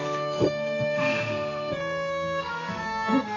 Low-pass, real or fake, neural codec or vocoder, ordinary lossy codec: 7.2 kHz; fake; codec, 32 kHz, 1.9 kbps, SNAC; none